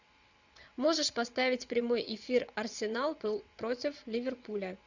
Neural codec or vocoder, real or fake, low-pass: vocoder, 22.05 kHz, 80 mel bands, WaveNeXt; fake; 7.2 kHz